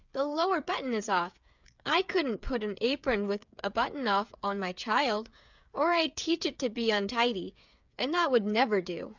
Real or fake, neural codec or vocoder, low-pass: fake; codec, 16 kHz, 8 kbps, FreqCodec, smaller model; 7.2 kHz